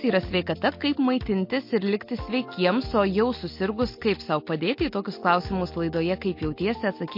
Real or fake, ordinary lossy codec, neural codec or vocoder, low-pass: real; MP3, 32 kbps; none; 5.4 kHz